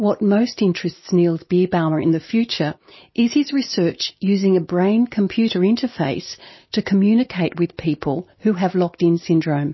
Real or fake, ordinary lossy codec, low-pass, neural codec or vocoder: real; MP3, 24 kbps; 7.2 kHz; none